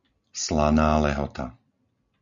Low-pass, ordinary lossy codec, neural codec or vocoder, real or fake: 7.2 kHz; Opus, 64 kbps; none; real